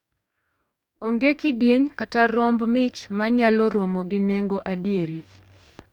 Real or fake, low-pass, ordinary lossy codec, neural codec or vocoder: fake; 19.8 kHz; none; codec, 44.1 kHz, 2.6 kbps, DAC